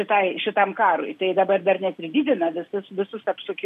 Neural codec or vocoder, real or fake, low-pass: none; real; 14.4 kHz